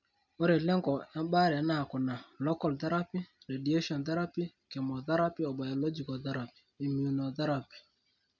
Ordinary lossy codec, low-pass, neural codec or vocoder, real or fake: none; 7.2 kHz; none; real